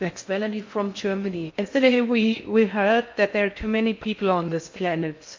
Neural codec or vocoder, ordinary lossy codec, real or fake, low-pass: codec, 16 kHz in and 24 kHz out, 0.6 kbps, FocalCodec, streaming, 4096 codes; MP3, 48 kbps; fake; 7.2 kHz